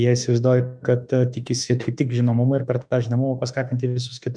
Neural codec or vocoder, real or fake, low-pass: autoencoder, 48 kHz, 32 numbers a frame, DAC-VAE, trained on Japanese speech; fake; 9.9 kHz